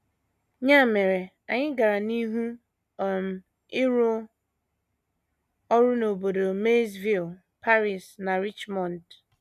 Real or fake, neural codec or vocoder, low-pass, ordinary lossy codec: real; none; 14.4 kHz; none